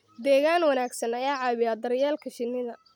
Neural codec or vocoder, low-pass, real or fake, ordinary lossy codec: none; 19.8 kHz; real; none